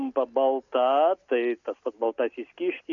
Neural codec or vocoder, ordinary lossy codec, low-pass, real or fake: none; MP3, 96 kbps; 7.2 kHz; real